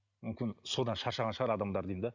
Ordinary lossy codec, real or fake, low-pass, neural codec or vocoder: none; real; 7.2 kHz; none